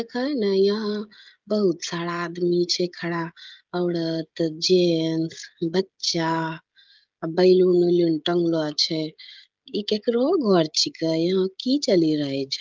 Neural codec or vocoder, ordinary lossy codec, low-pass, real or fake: codec, 16 kHz, 16 kbps, FreqCodec, smaller model; Opus, 24 kbps; 7.2 kHz; fake